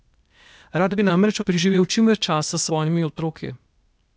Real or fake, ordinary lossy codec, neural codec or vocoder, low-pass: fake; none; codec, 16 kHz, 0.8 kbps, ZipCodec; none